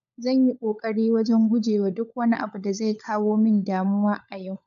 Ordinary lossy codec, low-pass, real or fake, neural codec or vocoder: AAC, 96 kbps; 7.2 kHz; fake; codec, 16 kHz, 16 kbps, FunCodec, trained on LibriTTS, 50 frames a second